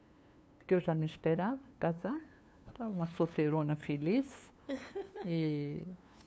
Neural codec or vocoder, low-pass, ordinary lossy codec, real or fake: codec, 16 kHz, 2 kbps, FunCodec, trained on LibriTTS, 25 frames a second; none; none; fake